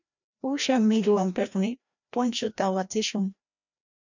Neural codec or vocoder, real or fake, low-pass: codec, 16 kHz, 1 kbps, FreqCodec, larger model; fake; 7.2 kHz